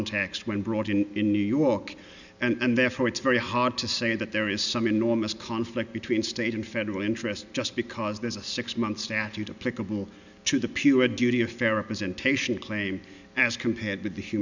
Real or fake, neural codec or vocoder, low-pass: real; none; 7.2 kHz